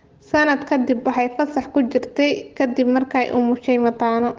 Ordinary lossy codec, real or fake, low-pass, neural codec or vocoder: Opus, 16 kbps; real; 7.2 kHz; none